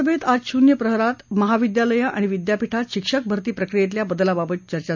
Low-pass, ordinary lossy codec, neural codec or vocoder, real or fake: 7.2 kHz; none; none; real